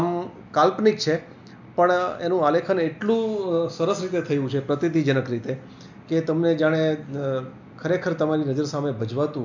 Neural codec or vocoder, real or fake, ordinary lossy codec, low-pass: none; real; none; 7.2 kHz